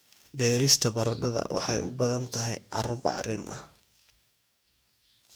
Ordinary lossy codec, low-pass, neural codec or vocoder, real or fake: none; none; codec, 44.1 kHz, 2.6 kbps, DAC; fake